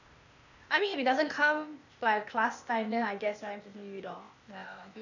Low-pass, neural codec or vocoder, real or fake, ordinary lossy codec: 7.2 kHz; codec, 16 kHz, 0.8 kbps, ZipCodec; fake; none